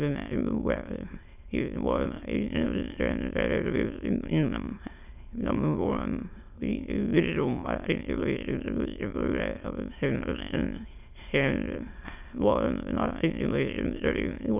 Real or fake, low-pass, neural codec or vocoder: fake; 3.6 kHz; autoencoder, 22.05 kHz, a latent of 192 numbers a frame, VITS, trained on many speakers